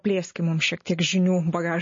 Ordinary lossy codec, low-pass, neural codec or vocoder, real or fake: MP3, 32 kbps; 7.2 kHz; none; real